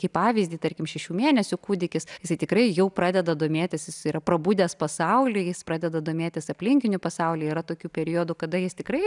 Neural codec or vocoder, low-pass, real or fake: none; 10.8 kHz; real